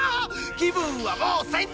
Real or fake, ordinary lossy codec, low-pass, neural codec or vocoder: real; none; none; none